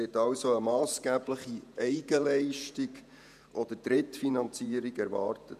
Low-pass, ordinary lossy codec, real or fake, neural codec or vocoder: 14.4 kHz; none; fake; vocoder, 48 kHz, 128 mel bands, Vocos